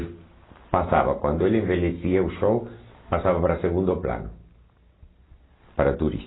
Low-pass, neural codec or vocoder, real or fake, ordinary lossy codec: 7.2 kHz; none; real; AAC, 16 kbps